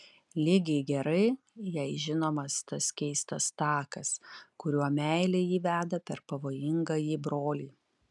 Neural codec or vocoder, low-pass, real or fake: none; 10.8 kHz; real